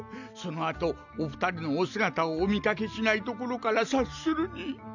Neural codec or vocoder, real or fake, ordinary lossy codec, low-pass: none; real; none; 7.2 kHz